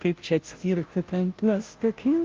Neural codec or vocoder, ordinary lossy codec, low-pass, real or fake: codec, 16 kHz, 0.5 kbps, FunCodec, trained on Chinese and English, 25 frames a second; Opus, 32 kbps; 7.2 kHz; fake